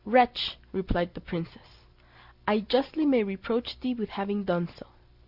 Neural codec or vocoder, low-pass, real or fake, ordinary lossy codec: none; 5.4 kHz; real; Opus, 64 kbps